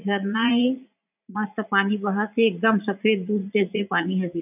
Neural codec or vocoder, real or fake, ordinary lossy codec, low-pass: vocoder, 44.1 kHz, 80 mel bands, Vocos; fake; none; 3.6 kHz